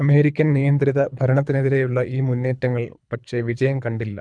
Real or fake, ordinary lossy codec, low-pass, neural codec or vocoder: fake; none; 9.9 kHz; codec, 24 kHz, 3 kbps, HILCodec